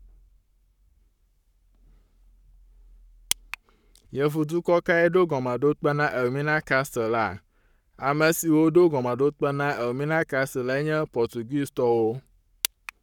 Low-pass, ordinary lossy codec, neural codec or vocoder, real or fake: 19.8 kHz; none; codec, 44.1 kHz, 7.8 kbps, Pupu-Codec; fake